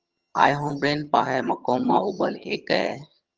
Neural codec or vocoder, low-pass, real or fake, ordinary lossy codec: vocoder, 22.05 kHz, 80 mel bands, HiFi-GAN; 7.2 kHz; fake; Opus, 24 kbps